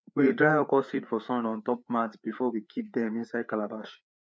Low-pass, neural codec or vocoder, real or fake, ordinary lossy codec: none; codec, 16 kHz, 4 kbps, FreqCodec, larger model; fake; none